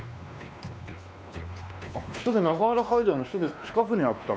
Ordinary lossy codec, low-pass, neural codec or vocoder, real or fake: none; none; codec, 16 kHz, 2 kbps, X-Codec, WavLM features, trained on Multilingual LibriSpeech; fake